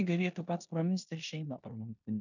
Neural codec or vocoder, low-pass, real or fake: codec, 16 kHz in and 24 kHz out, 0.9 kbps, LongCat-Audio-Codec, four codebook decoder; 7.2 kHz; fake